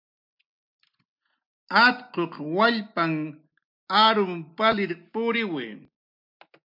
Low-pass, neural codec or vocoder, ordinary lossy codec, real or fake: 5.4 kHz; none; MP3, 48 kbps; real